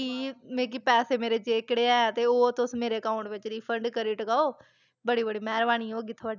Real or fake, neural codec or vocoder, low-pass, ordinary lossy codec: real; none; 7.2 kHz; none